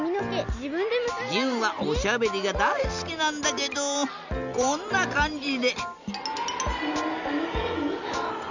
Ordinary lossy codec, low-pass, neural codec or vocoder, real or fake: none; 7.2 kHz; none; real